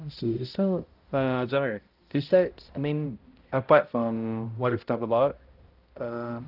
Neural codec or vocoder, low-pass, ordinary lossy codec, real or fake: codec, 16 kHz, 0.5 kbps, X-Codec, HuBERT features, trained on balanced general audio; 5.4 kHz; Opus, 32 kbps; fake